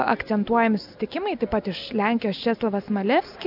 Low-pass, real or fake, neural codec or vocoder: 5.4 kHz; real; none